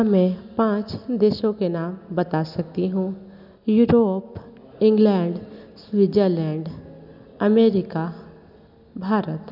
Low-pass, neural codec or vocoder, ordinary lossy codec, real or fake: 5.4 kHz; none; none; real